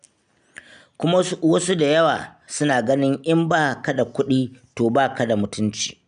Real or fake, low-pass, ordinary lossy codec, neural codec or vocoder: real; 9.9 kHz; none; none